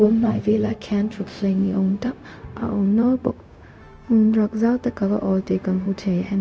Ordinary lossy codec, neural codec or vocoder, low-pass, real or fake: none; codec, 16 kHz, 0.4 kbps, LongCat-Audio-Codec; none; fake